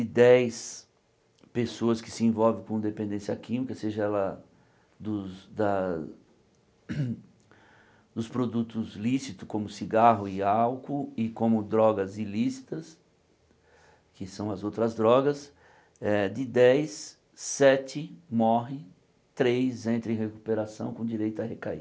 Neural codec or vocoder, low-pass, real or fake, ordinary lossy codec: none; none; real; none